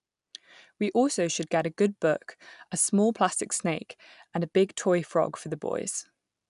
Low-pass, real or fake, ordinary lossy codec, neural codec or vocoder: 10.8 kHz; real; none; none